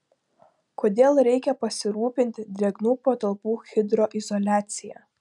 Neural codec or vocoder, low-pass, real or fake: none; 10.8 kHz; real